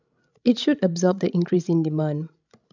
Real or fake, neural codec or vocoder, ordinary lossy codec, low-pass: fake; codec, 16 kHz, 16 kbps, FreqCodec, larger model; none; 7.2 kHz